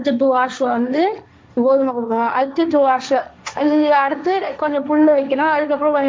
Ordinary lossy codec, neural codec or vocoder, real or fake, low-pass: none; codec, 16 kHz, 1.1 kbps, Voila-Tokenizer; fake; none